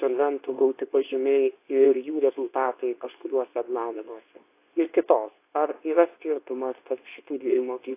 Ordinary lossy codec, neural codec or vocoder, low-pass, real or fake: AAC, 24 kbps; codec, 24 kHz, 0.9 kbps, WavTokenizer, medium speech release version 2; 3.6 kHz; fake